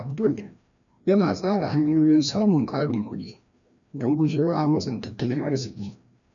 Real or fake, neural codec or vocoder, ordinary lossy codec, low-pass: fake; codec, 16 kHz, 1 kbps, FreqCodec, larger model; none; 7.2 kHz